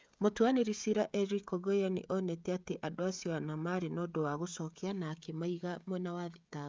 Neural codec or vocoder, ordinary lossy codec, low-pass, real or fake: codec, 16 kHz, 16 kbps, FreqCodec, smaller model; none; none; fake